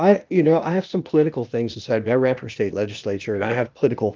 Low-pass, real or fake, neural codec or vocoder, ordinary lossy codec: 7.2 kHz; fake; codec, 16 kHz, 0.8 kbps, ZipCodec; Opus, 24 kbps